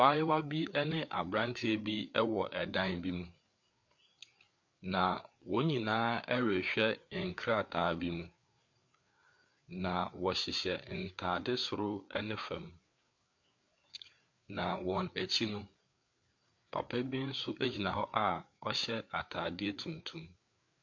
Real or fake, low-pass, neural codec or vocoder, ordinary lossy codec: fake; 7.2 kHz; codec, 16 kHz, 4 kbps, FreqCodec, larger model; MP3, 48 kbps